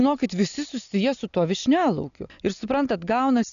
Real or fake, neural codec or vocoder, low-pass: real; none; 7.2 kHz